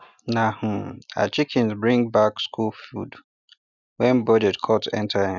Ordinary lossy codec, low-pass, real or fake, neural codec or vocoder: none; 7.2 kHz; real; none